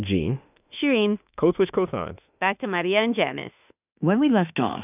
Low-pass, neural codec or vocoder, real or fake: 3.6 kHz; autoencoder, 48 kHz, 32 numbers a frame, DAC-VAE, trained on Japanese speech; fake